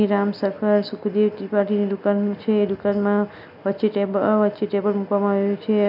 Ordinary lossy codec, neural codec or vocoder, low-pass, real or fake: none; none; 5.4 kHz; real